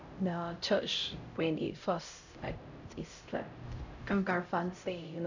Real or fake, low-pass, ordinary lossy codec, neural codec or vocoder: fake; 7.2 kHz; none; codec, 16 kHz, 0.5 kbps, X-Codec, HuBERT features, trained on LibriSpeech